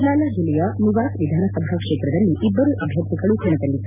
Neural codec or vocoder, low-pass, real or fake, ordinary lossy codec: none; 3.6 kHz; real; none